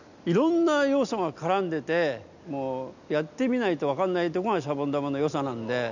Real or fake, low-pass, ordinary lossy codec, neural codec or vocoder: real; 7.2 kHz; none; none